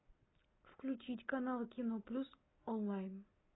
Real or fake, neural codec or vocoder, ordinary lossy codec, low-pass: real; none; AAC, 16 kbps; 7.2 kHz